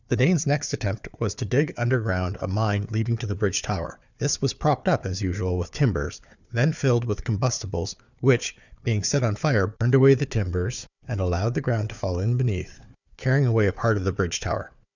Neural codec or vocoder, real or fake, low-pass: codec, 16 kHz, 4 kbps, FunCodec, trained on Chinese and English, 50 frames a second; fake; 7.2 kHz